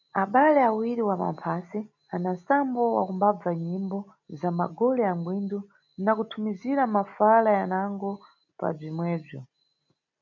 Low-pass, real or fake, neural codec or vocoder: 7.2 kHz; real; none